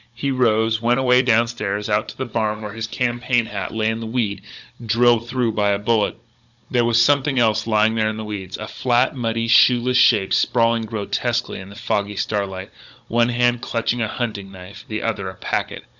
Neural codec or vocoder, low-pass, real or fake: codec, 16 kHz, 16 kbps, FunCodec, trained on Chinese and English, 50 frames a second; 7.2 kHz; fake